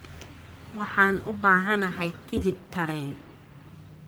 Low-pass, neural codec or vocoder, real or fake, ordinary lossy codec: none; codec, 44.1 kHz, 1.7 kbps, Pupu-Codec; fake; none